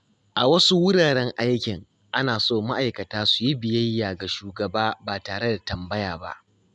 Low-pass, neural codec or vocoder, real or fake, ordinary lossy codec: none; none; real; none